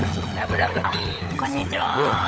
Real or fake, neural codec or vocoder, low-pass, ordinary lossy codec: fake; codec, 16 kHz, 16 kbps, FunCodec, trained on LibriTTS, 50 frames a second; none; none